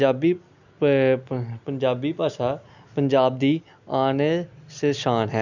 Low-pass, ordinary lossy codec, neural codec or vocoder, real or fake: 7.2 kHz; none; none; real